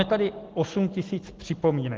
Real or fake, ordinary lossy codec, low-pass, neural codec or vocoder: real; Opus, 16 kbps; 7.2 kHz; none